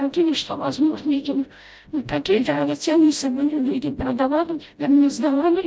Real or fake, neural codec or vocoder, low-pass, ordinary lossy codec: fake; codec, 16 kHz, 0.5 kbps, FreqCodec, smaller model; none; none